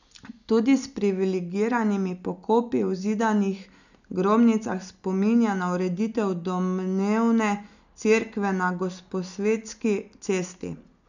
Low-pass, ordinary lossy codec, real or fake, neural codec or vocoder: 7.2 kHz; none; real; none